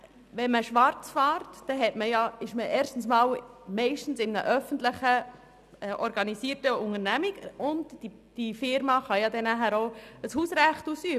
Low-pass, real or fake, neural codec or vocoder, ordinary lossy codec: 14.4 kHz; real; none; none